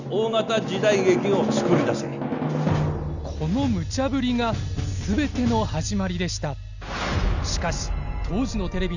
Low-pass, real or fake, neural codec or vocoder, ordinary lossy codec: 7.2 kHz; real; none; none